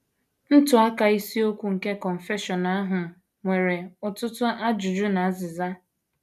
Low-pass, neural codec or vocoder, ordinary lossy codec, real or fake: 14.4 kHz; none; none; real